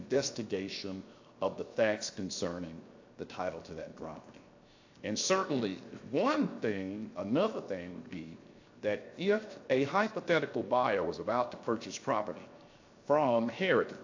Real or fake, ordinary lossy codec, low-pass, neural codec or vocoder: fake; AAC, 48 kbps; 7.2 kHz; codec, 16 kHz, 0.7 kbps, FocalCodec